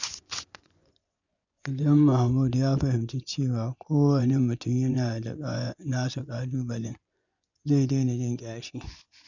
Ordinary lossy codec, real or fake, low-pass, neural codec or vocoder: none; fake; 7.2 kHz; vocoder, 24 kHz, 100 mel bands, Vocos